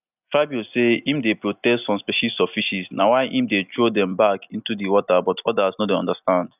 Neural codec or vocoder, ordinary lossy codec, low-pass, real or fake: none; none; 3.6 kHz; real